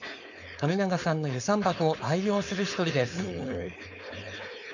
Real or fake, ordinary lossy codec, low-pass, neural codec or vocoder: fake; none; 7.2 kHz; codec, 16 kHz, 4.8 kbps, FACodec